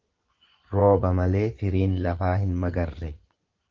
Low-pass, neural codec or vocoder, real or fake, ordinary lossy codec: 7.2 kHz; vocoder, 24 kHz, 100 mel bands, Vocos; fake; Opus, 16 kbps